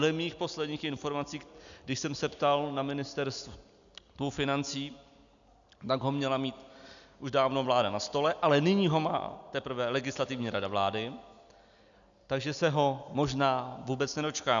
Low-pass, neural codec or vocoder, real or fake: 7.2 kHz; none; real